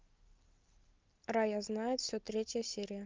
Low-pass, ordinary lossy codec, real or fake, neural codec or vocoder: 7.2 kHz; Opus, 32 kbps; real; none